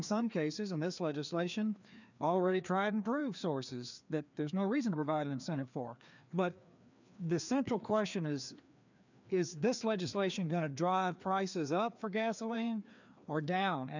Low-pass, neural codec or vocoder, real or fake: 7.2 kHz; codec, 16 kHz, 2 kbps, FreqCodec, larger model; fake